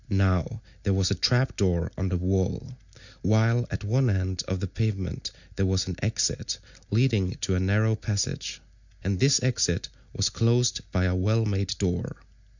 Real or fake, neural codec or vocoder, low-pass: real; none; 7.2 kHz